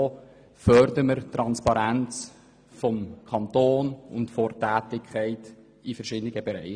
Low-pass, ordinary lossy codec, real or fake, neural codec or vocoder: 9.9 kHz; none; real; none